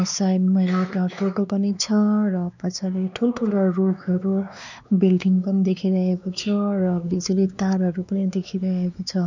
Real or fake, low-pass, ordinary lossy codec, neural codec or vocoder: fake; 7.2 kHz; none; codec, 16 kHz, 2 kbps, X-Codec, WavLM features, trained on Multilingual LibriSpeech